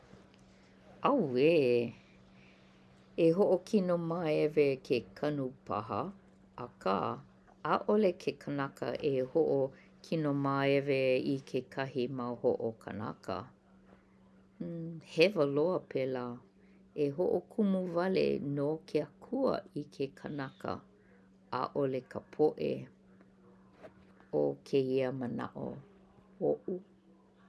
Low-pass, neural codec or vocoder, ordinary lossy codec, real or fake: none; none; none; real